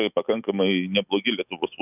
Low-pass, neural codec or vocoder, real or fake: 3.6 kHz; autoencoder, 48 kHz, 128 numbers a frame, DAC-VAE, trained on Japanese speech; fake